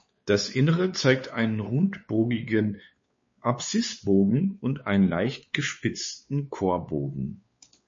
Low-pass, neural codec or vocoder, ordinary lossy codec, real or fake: 7.2 kHz; codec, 16 kHz, 4 kbps, X-Codec, WavLM features, trained on Multilingual LibriSpeech; MP3, 32 kbps; fake